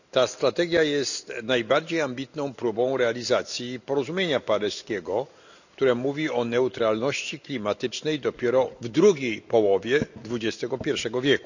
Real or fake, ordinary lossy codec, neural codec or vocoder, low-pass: real; none; none; 7.2 kHz